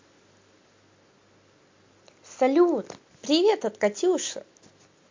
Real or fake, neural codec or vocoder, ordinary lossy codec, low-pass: real; none; MP3, 48 kbps; 7.2 kHz